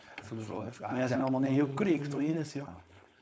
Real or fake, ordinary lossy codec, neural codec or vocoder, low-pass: fake; none; codec, 16 kHz, 4.8 kbps, FACodec; none